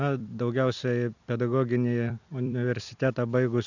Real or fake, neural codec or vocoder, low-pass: fake; vocoder, 44.1 kHz, 128 mel bands every 256 samples, BigVGAN v2; 7.2 kHz